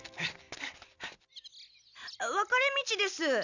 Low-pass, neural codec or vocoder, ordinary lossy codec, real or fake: 7.2 kHz; none; none; real